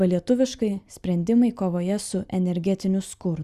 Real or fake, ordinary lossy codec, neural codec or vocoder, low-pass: real; Opus, 64 kbps; none; 14.4 kHz